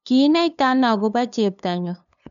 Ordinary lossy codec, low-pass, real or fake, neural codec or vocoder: none; 7.2 kHz; fake; codec, 16 kHz, 2 kbps, FunCodec, trained on LibriTTS, 25 frames a second